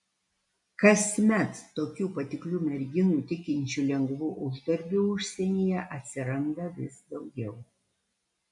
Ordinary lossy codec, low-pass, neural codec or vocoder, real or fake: MP3, 96 kbps; 10.8 kHz; none; real